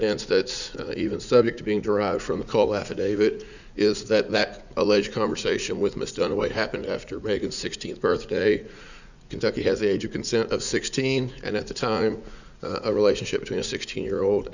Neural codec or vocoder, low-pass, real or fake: vocoder, 44.1 kHz, 80 mel bands, Vocos; 7.2 kHz; fake